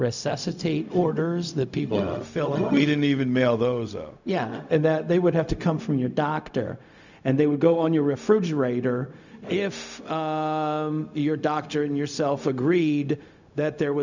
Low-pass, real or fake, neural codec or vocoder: 7.2 kHz; fake; codec, 16 kHz, 0.4 kbps, LongCat-Audio-Codec